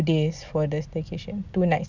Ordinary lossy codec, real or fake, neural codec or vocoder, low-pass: none; real; none; 7.2 kHz